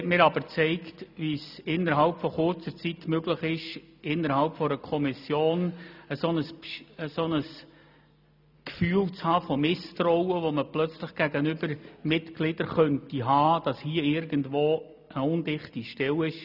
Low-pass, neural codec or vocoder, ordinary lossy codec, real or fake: 5.4 kHz; none; none; real